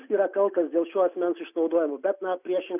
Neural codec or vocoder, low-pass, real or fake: none; 3.6 kHz; real